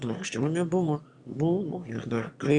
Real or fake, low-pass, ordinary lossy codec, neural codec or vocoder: fake; 9.9 kHz; Opus, 64 kbps; autoencoder, 22.05 kHz, a latent of 192 numbers a frame, VITS, trained on one speaker